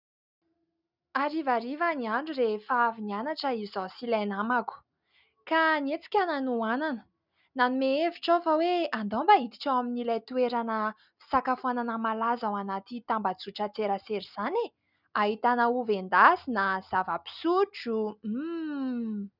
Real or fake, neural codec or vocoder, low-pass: real; none; 5.4 kHz